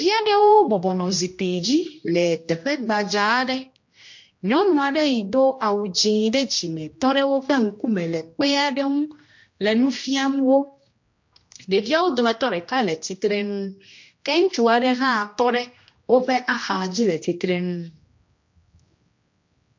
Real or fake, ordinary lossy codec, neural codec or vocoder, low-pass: fake; MP3, 48 kbps; codec, 16 kHz, 1 kbps, X-Codec, HuBERT features, trained on general audio; 7.2 kHz